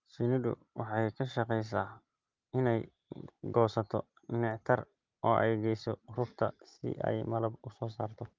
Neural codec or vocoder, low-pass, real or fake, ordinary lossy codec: none; 7.2 kHz; real; Opus, 32 kbps